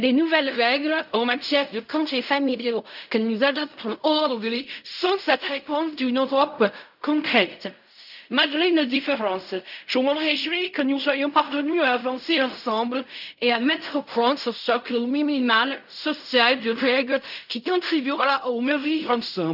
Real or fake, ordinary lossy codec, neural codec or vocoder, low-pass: fake; none; codec, 16 kHz in and 24 kHz out, 0.4 kbps, LongCat-Audio-Codec, fine tuned four codebook decoder; 5.4 kHz